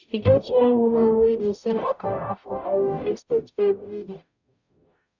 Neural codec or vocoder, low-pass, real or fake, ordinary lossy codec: codec, 44.1 kHz, 0.9 kbps, DAC; 7.2 kHz; fake; none